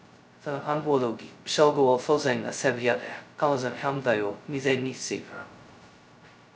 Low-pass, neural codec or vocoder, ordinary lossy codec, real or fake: none; codec, 16 kHz, 0.2 kbps, FocalCodec; none; fake